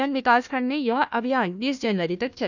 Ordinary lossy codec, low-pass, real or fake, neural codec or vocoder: none; 7.2 kHz; fake; codec, 16 kHz, 1 kbps, FunCodec, trained on Chinese and English, 50 frames a second